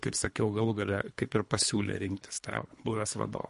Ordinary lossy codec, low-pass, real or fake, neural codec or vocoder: MP3, 48 kbps; 10.8 kHz; fake; codec, 24 kHz, 3 kbps, HILCodec